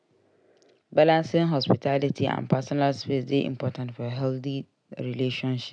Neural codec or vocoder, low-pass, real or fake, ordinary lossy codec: none; 9.9 kHz; real; none